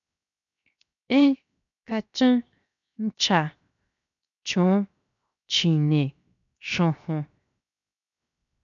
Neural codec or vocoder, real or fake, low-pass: codec, 16 kHz, 0.7 kbps, FocalCodec; fake; 7.2 kHz